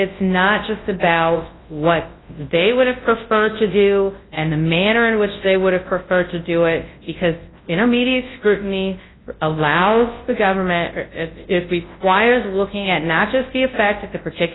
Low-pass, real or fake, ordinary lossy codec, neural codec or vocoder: 7.2 kHz; fake; AAC, 16 kbps; codec, 24 kHz, 0.9 kbps, WavTokenizer, large speech release